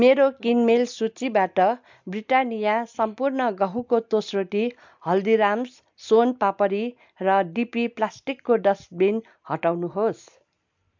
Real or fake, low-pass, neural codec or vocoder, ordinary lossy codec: real; 7.2 kHz; none; MP3, 64 kbps